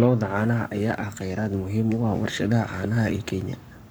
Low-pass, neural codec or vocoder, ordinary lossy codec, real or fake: none; codec, 44.1 kHz, 7.8 kbps, Pupu-Codec; none; fake